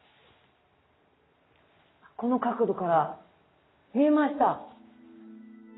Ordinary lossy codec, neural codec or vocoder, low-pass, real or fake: AAC, 16 kbps; none; 7.2 kHz; real